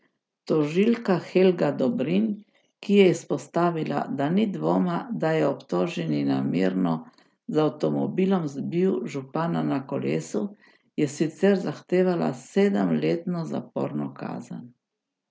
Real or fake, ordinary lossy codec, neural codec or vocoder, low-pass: real; none; none; none